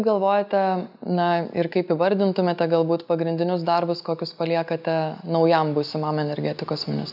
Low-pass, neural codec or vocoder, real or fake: 5.4 kHz; none; real